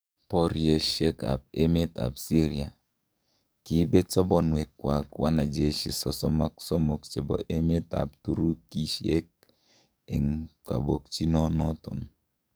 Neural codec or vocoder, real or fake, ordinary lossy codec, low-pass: codec, 44.1 kHz, 7.8 kbps, DAC; fake; none; none